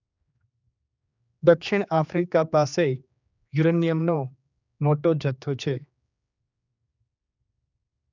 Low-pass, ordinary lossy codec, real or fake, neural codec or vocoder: 7.2 kHz; none; fake; codec, 16 kHz, 2 kbps, X-Codec, HuBERT features, trained on general audio